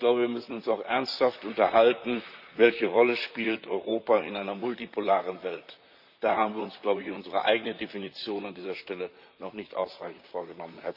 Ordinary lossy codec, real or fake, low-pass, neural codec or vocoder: none; fake; 5.4 kHz; vocoder, 44.1 kHz, 128 mel bands, Pupu-Vocoder